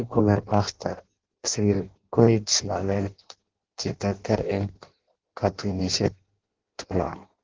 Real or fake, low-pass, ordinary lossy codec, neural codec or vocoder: fake; 7.2 kHz; Opus, 16 kbps; codec, 16 kHz in and 24 kHz out, 0.6 kbps, FireRedTTS-2 codec